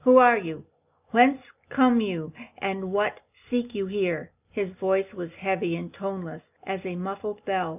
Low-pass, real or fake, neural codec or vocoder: 3.6 kHz; real; none